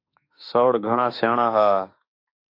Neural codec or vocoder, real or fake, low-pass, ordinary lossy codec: autoencoder, 48 kHz, 32 numbers a frame, DAC-VAE, trained on Japanese speech; fake; 5.4 kHz; AAC, 32 kbps